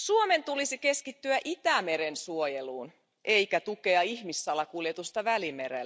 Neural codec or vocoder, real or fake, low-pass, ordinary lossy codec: none; real; none; none